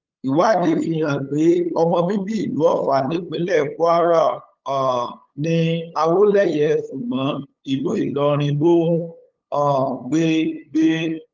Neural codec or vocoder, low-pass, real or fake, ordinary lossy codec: codec, 16 kHz, 8 kbps, FunCodec, trained on LibriTTS, 25 frames a second; 7.2 kHz; fake; Opus, 24 kbps